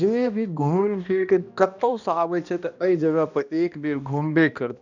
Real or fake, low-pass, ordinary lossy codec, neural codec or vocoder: fake; 7.2 kHz; none; codec, 16 kHz, 1 kbps, X-Codec, HuBERT features, trained on balanced general audio